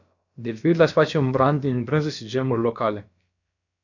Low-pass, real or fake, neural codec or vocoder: 7.2 kHz; fake; codec, 16 kHz, about 1 kbps, DyCAST, with the encoder's durations